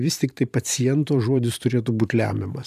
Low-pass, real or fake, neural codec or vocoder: 14.4 kHz; fake; vocoder, 44.1 kHz, 128 mel bands every 512 samples, BigVGAN v2